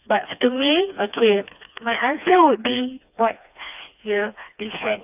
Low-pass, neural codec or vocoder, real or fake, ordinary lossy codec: 3.6 kHz; codec, 16 kHz, 2 kbps, FreqCodec, smaller model; fake; none